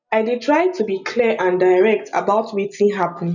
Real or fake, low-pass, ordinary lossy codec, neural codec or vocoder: real; 7.2 kHz; none; none